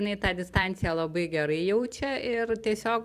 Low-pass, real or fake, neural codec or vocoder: 14.4 kHz; fake; vocoder, 44.1 kHz, 128 mel bands every 256 samples, BigVGAN v2